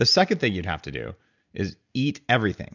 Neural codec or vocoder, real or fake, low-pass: none; real; 7.2 kHz